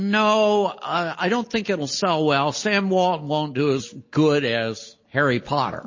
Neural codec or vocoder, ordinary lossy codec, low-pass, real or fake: none; MP3, 32 kbps; 7.2 kHz; real